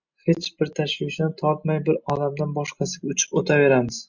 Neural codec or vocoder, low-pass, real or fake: none; 7.2 kHz; real